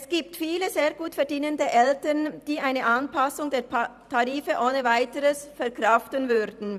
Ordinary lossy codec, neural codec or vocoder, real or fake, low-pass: none; vocoder, 44.1 kHz, 128 mel bands every 512 samples, BigVGAN v2; fake; 14.4 kHz